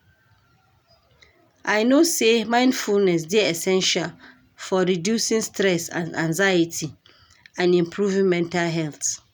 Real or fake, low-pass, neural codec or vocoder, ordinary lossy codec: real; none; none; none